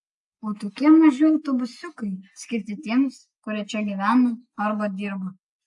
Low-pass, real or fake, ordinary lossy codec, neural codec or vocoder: 10.8 kHz; real; AAC, 64 kbps; none